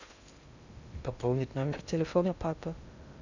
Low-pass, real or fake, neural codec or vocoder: 7.2 kHz; fake; codec, 16 kHz in and 24 kHz out, 0.6 kbps, FocalCodec, streaming, 2048 codes